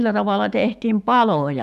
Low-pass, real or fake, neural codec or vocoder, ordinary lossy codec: 14.4 kHz; fake; autoencoder, 48 kHz, 128 numbers a frame, DAC-VAE, trained on Japanese speech; none